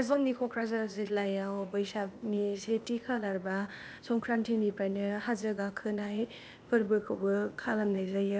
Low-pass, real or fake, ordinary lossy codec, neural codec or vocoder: none; fake; none; codec, 16 kHz, 0.8 kbps, ZipCodec